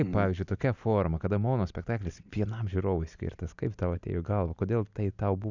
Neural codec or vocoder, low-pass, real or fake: none; 7.2 kHz; real